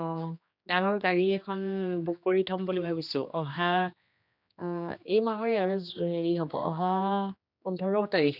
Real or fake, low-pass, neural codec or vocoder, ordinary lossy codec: fake; 5.4 kHz; codec, 16 kHz, 2 kbps, X-Codec, HuBERT features, trained on general audio; none